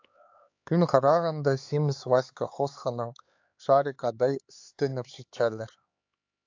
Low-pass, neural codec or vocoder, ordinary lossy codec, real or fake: 7.2 kHz; codec, 16 kHz, 4 kbps, X-Codec, HuBERT features, trained on LibriSpeech; AAC, 48 kbps; fake